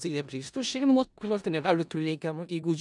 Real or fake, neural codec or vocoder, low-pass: fake; codec, 16 kHz in and 24 kHz out, 0.4 kbps, LongCat-Audio-Codec, four codebook decoder; 10.8 kHz